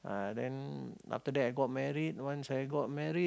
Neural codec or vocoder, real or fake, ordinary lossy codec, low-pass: none; real; none; none